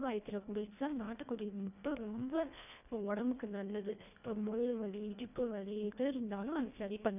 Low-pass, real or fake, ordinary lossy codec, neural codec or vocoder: 3.6 kHz; fake; none; codec, 24 kHz, 1.5 kbps, HILCodec